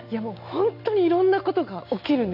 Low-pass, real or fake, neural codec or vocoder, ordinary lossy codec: 5.4 kHz; real; none; none